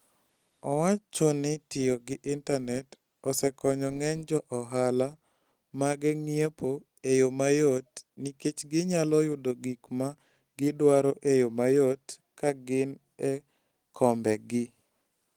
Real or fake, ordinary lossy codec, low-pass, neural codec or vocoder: real; Opus, 24 kbps; 19.8 kHz; none